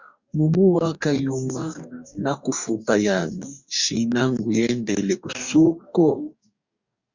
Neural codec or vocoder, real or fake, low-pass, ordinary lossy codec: codec, 44.1 kHz, 2.6 kbps, DAC; fake; 7.2 kHz; Opus, 64 kbps